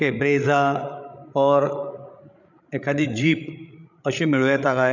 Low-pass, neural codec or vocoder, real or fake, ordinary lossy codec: 7.2 kHz; codec, 16 kHz, 16 kbps, FreqCodec, larger model; fake; none